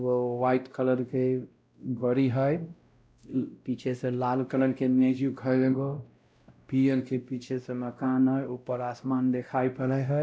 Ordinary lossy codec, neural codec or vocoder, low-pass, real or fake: none; codec, 16 kHz, 0.5 kbps, X-Codec, WavLM features, trained on Multilingual LibriSpeech; none; fake